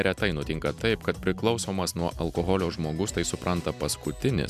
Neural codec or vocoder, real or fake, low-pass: none; real; 14.4 kHz